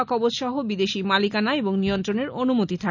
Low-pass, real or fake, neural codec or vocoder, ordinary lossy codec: 7.2 kHz; real; none; none